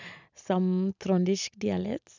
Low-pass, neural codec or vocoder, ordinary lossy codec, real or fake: 7.2 kHz; none; none; real